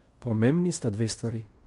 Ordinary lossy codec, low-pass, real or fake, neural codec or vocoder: MP3, 64 kbps; 10.8 kHz; fake; codec, 16 kHz in and 24 kHz out, 0.9 kbps, LongCat-Audio-Codec, fine tuned four codebook decoder